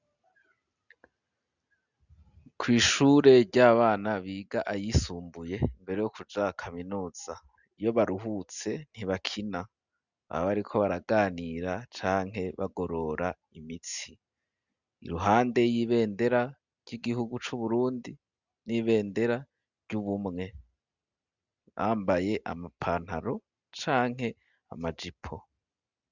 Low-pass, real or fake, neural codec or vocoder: 7.2 kHz; real; none